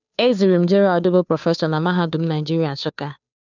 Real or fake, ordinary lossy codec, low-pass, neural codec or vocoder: fake; none; 7.2 kHz; codec, 16 kHz, 2 kbps, FunCodec, trained on Chinese and English, 25 frames a second